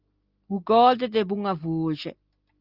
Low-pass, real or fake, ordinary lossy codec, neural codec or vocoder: 5.4 kHz; real; Opus, 32 kbps; none